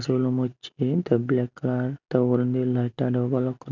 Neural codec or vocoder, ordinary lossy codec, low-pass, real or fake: none; none; 7.2 kHz; real